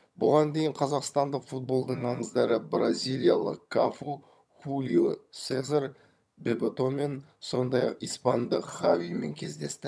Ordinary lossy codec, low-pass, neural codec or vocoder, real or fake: none; none; vocoder, 22.05 kHz, 80 mel bands, HiFi-GAN; fake